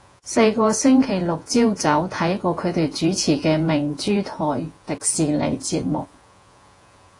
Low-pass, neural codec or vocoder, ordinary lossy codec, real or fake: 10.8 kHz; vocoder, 48 kHz, 128 mel bands, Vocos; AAC, 48 kbps; fake